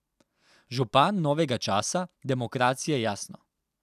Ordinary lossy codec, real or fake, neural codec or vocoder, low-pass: none; real; none; 14.4 kHz